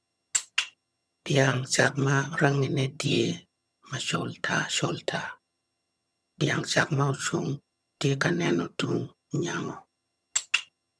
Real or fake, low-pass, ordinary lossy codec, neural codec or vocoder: fake; none; none; vocoder, 22.05 kHz, 80 mel bands, HiFi-GAN